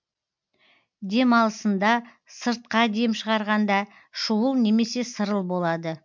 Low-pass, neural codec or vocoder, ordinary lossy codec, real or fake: 7.2 kHz; none; MP3, 64 kbps; real